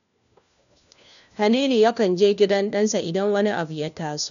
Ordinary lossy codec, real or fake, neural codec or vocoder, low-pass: none; fake; codec, 16 kHz, 1 kbps, FunCodec, trained on LibriTTS, 50 frames a second; 7.2 kHz